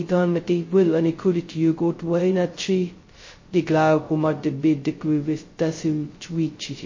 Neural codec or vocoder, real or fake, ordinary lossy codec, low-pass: codec, 16 kHz, 0.2 kbps, FocalCodec; fake; MP3, 32 kbps; 7.2 kHz